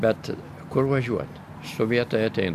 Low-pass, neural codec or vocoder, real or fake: 14.4 kHz; none; real